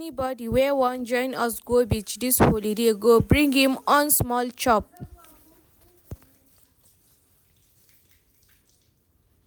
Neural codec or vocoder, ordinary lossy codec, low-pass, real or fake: none; none; none; real